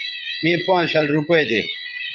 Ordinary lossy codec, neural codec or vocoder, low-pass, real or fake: Opus, 24 kbps; vocoder, 22.05 kHz, 80 mel bands, Vocos; 7.2 kHz; fake